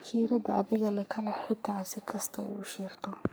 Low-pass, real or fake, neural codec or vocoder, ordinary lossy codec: none; fake; codec, 44.1 kHz, 3.4 kbps, Pupu-Codec; none